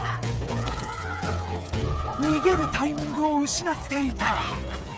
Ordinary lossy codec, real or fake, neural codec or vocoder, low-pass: none; fake; codec, 16 kHz, 8 kbps, FreqCodec, smaller model; none